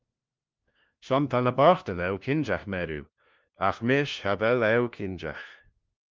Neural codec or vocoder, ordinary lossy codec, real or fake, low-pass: codec, 16 kHz, 1 kbps, FunCodec, trained on LibriTTS, 50 frames a second; Opus, 24 kbps; fake; 7.2 kHz